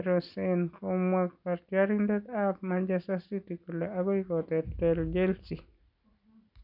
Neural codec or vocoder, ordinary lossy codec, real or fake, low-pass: none; none; real; 5.4 kHz